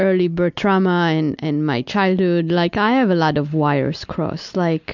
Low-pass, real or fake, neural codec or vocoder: 7.2 kHz; real; none